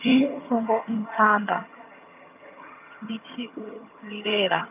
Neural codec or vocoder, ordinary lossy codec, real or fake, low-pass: vocoder, 22.05 kHz, 80 mel bands, HiFi-GAN; none; fake; 3.6 kHz